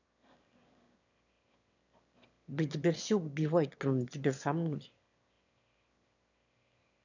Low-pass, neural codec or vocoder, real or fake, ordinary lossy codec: 7.2 kHz; autoencoder, 22.05 kHz, a latent of 192 numbers a frame, VITS, trained on one speaker; fake; none